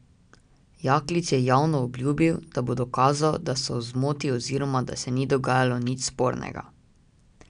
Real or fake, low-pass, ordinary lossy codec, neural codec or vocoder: real; 9.9 kHz; none; none